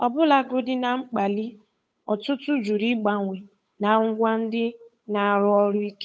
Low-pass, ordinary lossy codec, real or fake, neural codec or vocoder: none; none; fake; codec, 16 kHz, 8 kbps, FunCodec, trained on Chinese and English, 25 frames a second